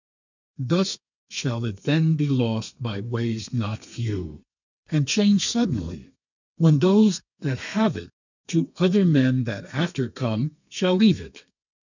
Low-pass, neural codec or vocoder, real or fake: 7.2 kHz; codec, 32 kHz, 1.9 kbps, SNAC; fake